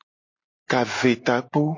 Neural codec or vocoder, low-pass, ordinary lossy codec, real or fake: none; 7.2 kHz; MP3, 32 kbps; real